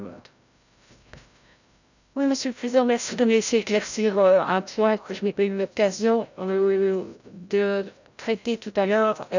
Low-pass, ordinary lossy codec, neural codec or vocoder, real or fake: 7.2 kHz; none; codec, 16 kHz, 0.5 kbps, FreqCodec, larger model; fake